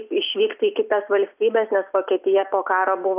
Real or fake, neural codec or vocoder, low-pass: real; none; 3.6 kHz